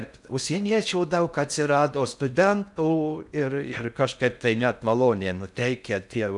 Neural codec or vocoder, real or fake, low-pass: codec, 16 kHz in and 24 kHz out, 0.6 kbps, FocalCodec, streaming, 4096 codes; fake; 10.8 kHz